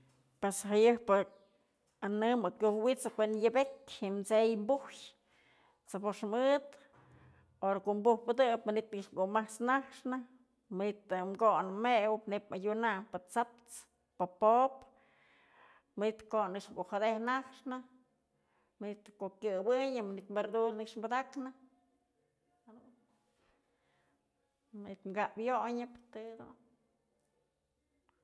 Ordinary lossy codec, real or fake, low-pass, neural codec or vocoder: none; real; none; none